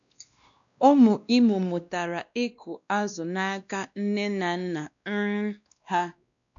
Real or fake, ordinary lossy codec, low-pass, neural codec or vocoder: fake; MP3, 96 kbps; 7.2 kHz; codec, 16 kHz, 1 kbps, X-Codec, WavLM features, trained on Multilingual LibriSpeech